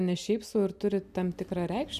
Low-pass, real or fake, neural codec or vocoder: 14.4 kHz; real; none